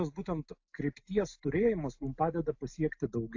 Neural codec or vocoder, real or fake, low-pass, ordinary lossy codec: none; real; 7.2 kHz; AAC, 48 kbps